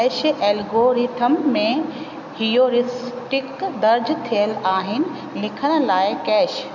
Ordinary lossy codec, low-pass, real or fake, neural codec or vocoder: none; 7.2 kHz; real; none